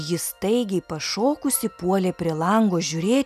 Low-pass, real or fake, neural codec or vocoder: 14.4 kHz; real; none